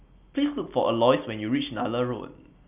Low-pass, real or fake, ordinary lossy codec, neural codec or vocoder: 3.6 kHz; real; none; none